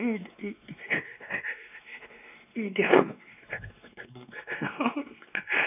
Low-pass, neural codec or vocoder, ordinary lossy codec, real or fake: 3.6 kHz; codec, 16 kHz, 2 kbps, X-Codec, WavLM features, trained on Multilingual LibriSpeech; none; fake